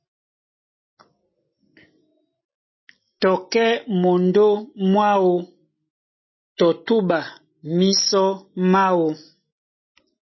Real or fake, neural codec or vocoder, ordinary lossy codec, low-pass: real; none; MP3, 24 kbps; 7.2 kHz